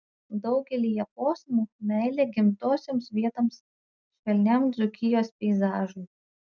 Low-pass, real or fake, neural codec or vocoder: 7.2 kHz; real; none